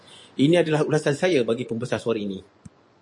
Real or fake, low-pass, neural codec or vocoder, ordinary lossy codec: fake; 10.8 kHz; vocoder, 24 kHz, 100 mel bands, Vocos; MP3, 48 kbps